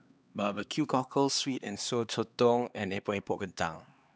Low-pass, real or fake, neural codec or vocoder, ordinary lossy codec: none; fake; codec, 16 kHz, 2 kbps, X-Codec, HuBERT features, trained on LibriSpeech; none